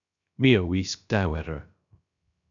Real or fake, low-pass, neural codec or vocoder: fake; 7.2 kHz; codec, 16 kHz, 0.3 kbps, FocalCodec